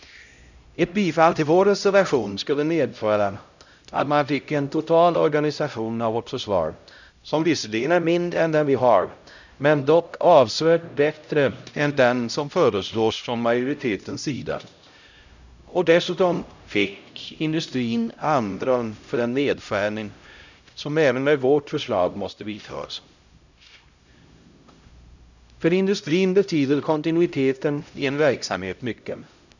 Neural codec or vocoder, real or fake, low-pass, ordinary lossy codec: codec, 16 kHz, 0.5 kbps, X-Codec, HuBERT features, trained on LibriSpeech; fake; 7.2 kHz; none